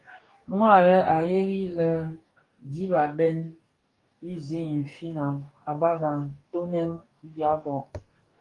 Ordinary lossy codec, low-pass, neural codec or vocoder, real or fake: Opus, 32 kbps; 10.8 kHz; codec, 44.1 kHz, 2.6 kbps, DAC; fake